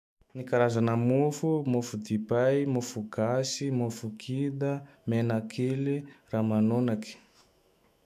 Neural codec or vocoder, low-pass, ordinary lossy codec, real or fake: autoencoder, 48 kHz, 128 numbers a frame, DAC-VAE, trained on Japanese speech; 14.4 kHz; none; fake